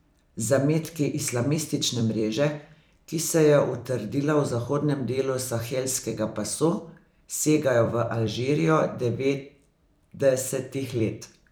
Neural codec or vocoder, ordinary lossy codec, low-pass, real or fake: vocoder, 44.1 kHz, 128 mel bands every 256 samples, BigVGAN v2; none; none; fake